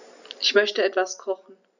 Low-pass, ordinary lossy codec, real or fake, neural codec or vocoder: 7.2 kHz; none; real; none